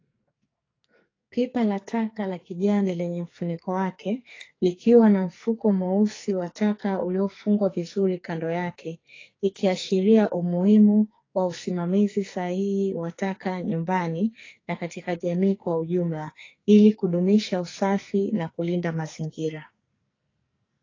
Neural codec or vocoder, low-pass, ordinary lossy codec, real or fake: codec, 44.1 kHz, 2.6 kbps, SNAC; 7.2 kHz; AAC, 32 kbps; fake